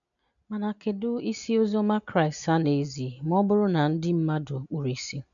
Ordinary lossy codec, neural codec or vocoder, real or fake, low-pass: none; none; real; 7.2 kHz